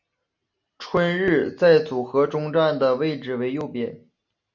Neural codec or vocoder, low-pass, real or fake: none; 7.2 kHz; real